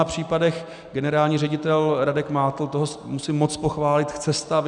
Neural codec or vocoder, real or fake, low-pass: none; real; 9.9 kHz